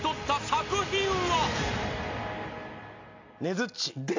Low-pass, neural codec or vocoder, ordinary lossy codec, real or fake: 7.2 kHz; none; MP3, 64 kbps; real